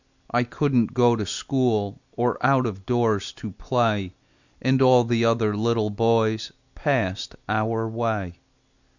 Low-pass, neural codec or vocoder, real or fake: 7.2 kHz; none; real